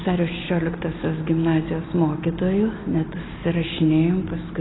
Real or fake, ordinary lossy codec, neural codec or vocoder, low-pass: real; AAC, 16 kbps; none; 7.2 kHz